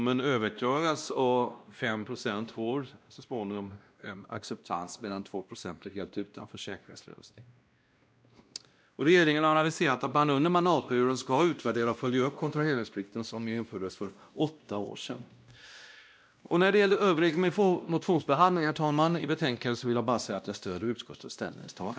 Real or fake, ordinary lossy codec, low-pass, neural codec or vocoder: fake; none; none; codec, 16 kHz, 1 kbps, X-Codec, WavLM features, trained on Multilingual LibriSpeech